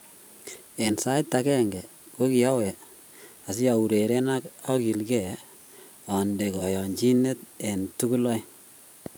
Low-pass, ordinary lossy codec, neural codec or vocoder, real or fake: none; none; vocoder, 44.1 kHz, 128 mel bands every 512 samples, BigVGAN v2; fake